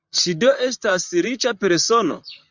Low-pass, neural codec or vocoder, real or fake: 7.2 kHz; vocoder, 44.1 kHz, 128 mel bands, Pupu-Vocoder; fake